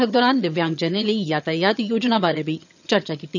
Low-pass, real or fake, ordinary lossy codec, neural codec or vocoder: 7.2 kHz; fake; none; vocoder, 22.05 kHz, 80 mel bands, HiFi-GAN